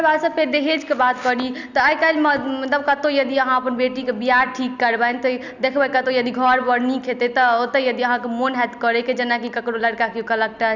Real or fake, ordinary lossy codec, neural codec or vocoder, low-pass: real; Opus, 64 kbps; none; 7.2 kHz